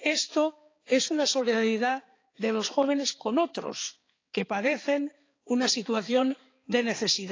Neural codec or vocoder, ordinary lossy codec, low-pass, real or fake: codec, 16 kHz, 4 kbps, X-Codec, HuBERT features, trained on balanced general audio; AAC, 32 kbps; 7.2 kHz; fake